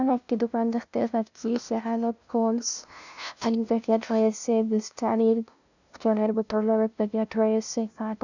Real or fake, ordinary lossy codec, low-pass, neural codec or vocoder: fake; none; 7.2 kHz; codec, 16 kHz, 0.5 kbps, FunCodec, trained on LibriTTS, 25 frames a second